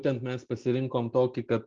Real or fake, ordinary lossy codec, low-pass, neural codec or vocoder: real; Opus, 32 kbps; 7.2 kHz; none